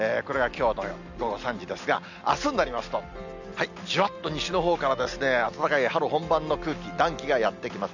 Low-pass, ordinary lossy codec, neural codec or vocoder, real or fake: 7.2 kHz; none; none; real